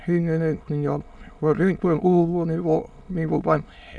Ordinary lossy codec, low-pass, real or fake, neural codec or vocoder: none; none; fake; autoencoder, 22.05 kHz, a latent of 192 numbers a frame, VITS, trained on many speakers